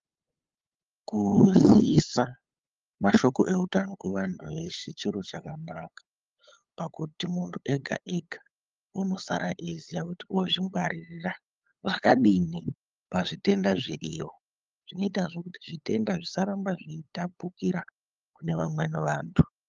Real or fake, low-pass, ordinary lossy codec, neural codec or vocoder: fake; 7.2 kHz; Opus, 32 kbps; codec, 16 kHz, 8 kbps, FunCodec, trained on LibriTTS, 25 frames a second